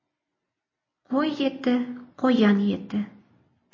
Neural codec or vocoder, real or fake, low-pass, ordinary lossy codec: none; real; 7.2 kHz; MP3, 32 kbps